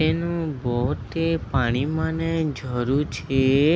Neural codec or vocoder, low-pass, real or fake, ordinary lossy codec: none; none; real; none